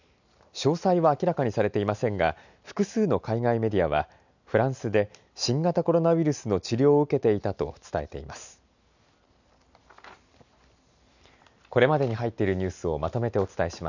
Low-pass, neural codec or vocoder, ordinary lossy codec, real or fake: 7.2 kHz; none; none; real